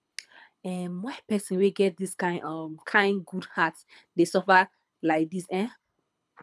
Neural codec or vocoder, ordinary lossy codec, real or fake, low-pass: codec, 24 kHz, 6 kbps, HILCodec; none; fake; none